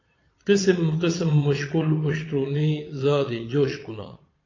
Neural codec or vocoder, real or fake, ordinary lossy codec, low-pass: vocoder, 22.05 kHz, 80 mel bands, Vocos; fake; AAC, 32 kbps; 7.2 kHz